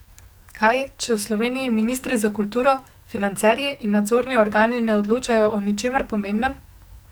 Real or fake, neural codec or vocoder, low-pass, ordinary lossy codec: fake; codec, 44.1 kHz, 2.6 kbps, SNAC; none; none